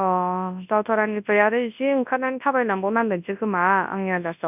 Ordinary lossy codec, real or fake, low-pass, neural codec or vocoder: none; fake; 3.6 kHz; codec, 24 kHz, 0.9 kbps, WavTokenizer, large speech release